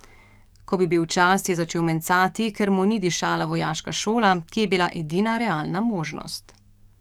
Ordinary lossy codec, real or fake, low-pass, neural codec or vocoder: none; fake; 19.8 kHz; codec, 44.1 kHz, 7.8 kbps, DAC